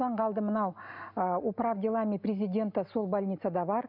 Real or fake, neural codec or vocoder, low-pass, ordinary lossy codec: real; none; 5.4 kHz; none